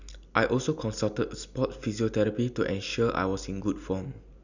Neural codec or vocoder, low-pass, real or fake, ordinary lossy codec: none; 7.2 kHz; real; none